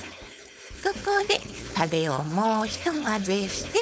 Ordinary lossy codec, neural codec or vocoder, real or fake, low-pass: none; codec, 16 kHz, 4.8 kbps, FACodec; fake; none